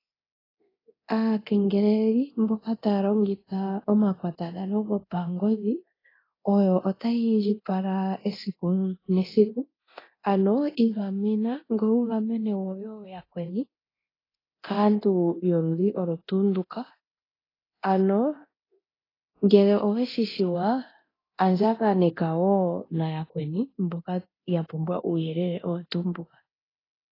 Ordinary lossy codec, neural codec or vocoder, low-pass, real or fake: AAC, 24 kbps; codec, 24 kHz, 0.9 kbps, DualCodec; 5.4 kHz; fake